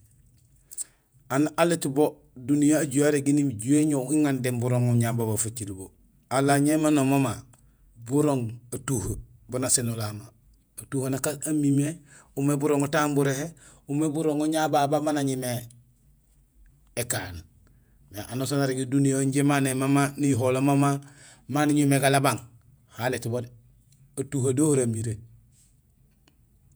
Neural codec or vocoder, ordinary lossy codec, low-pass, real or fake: vocoder, 48 kHz, 128 mel bands, Vocos; none; none; fake